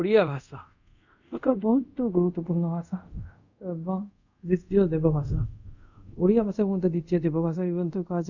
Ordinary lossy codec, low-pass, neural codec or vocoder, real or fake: none; 7.2 kHz; codec, 24 kHz, 0.5 kbps, DualCodec; fake